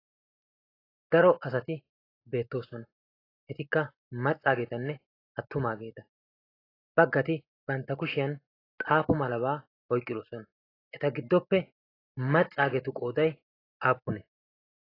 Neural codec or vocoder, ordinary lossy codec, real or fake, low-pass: none; AAC, 24 kbps; real; 5.4 kHz